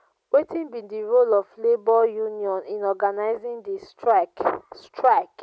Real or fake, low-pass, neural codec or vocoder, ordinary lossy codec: real; none; none; none